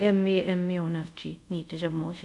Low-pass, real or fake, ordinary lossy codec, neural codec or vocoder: 10.8 kHz; fake; none; codec, 24 kHz, 0.5 kbps, DualCodec